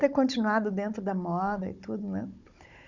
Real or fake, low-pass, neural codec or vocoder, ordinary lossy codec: fake; 7.2 kHz; codec, 16 kHz, 16 kbps, FunCodec, trained on Chinese and English, 50 frames a second; Opus, 64 kbps